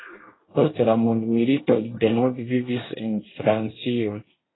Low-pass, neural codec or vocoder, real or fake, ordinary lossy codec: 7.2 kHz; codec, 24 kHz, 1 kbps, SNAC; fake; AAC, 16 kbps